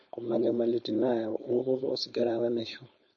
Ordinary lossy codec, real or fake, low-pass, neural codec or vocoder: MP3, 32 kbps; fake; 7.2 kHz; codec, 16 kHz, 4.8 kbps, FACodec